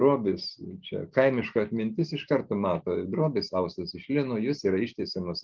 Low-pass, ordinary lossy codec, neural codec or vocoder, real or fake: 7.2 kHz; Opus, 32 kbps; none; real